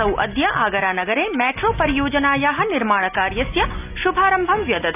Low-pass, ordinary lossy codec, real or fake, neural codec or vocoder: 3.6 kHz; none; real; none